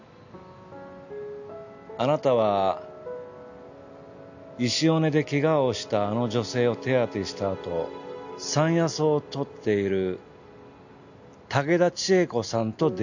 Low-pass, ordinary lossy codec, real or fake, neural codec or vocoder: 7.2 kHz; none; real; none